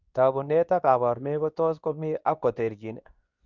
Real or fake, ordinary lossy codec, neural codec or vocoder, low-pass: fake; none; codec, 24 kHz, 0.9 kbps, WavTokenizer, medium speech release version 2; 7.2 kHz